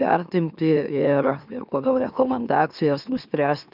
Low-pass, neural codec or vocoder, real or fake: 5.4 kHz; autoencoder, 44.1 kHz, a latent of 192 numbers a frame, MeloTTS; fake